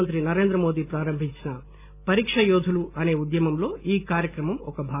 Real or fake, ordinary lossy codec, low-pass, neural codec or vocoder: real; none; 3.6 kHz; none